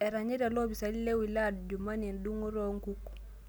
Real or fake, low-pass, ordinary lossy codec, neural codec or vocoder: real; none; none; none